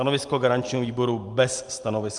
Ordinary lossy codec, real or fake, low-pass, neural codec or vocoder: Opus, 32 kbps; real; 10.8 kHz; none